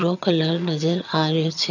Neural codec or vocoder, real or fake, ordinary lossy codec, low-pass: vocoder, 22.05 kHz, 80 mel bands, HiFi-GAN; fake; none; 7.2 kHz